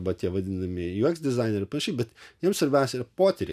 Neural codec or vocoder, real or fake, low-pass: none; real; 14.4 kHz